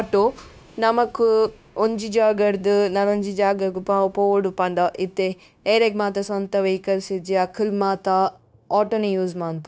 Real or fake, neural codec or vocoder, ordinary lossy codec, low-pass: fake; codec, 16 kHz, 0.9 kbps, LongCat-Audio-Codec; none; none